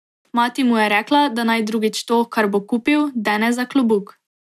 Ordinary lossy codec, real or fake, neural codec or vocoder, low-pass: none; real; none; 14.4 kHz